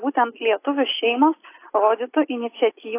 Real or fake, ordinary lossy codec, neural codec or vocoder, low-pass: real; AAC, 24 kbps; none; 3.6 kHz